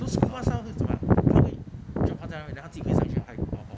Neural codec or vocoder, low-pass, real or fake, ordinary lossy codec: none; none; real; none